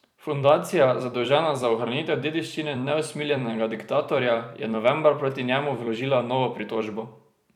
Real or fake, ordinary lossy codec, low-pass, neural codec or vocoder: fake; none; 19.8 kHz; vocoder, 44.1 kHz, 128 mel bands every 256 samples, BigVGAN v2